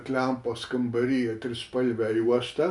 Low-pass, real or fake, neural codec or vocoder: 10.8 kHz; real; none